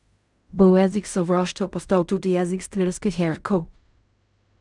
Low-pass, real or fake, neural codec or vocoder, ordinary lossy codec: 10.8 kHz; fake; codec, 16 kHz in and 24 kHz out, 0.4 kbps, LongCat-Audio-Codec, fine tuned four codebook decoder; none